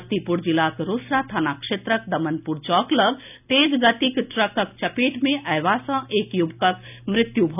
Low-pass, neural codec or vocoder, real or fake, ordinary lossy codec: 3.6 kHz; none; real; none